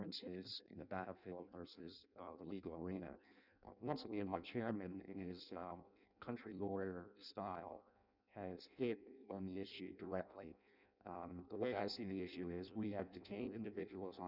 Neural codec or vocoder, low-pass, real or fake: codec, 16 kHz in and 24 kHz out, 0.6 kbps, FireRedTTS-2 codec; 5.4 kHz; fake